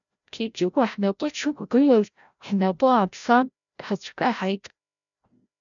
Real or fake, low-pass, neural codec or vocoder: fake; 7.2 kHz; codec, 16 kHz, 0.5 kbps, FreqCodec, larger model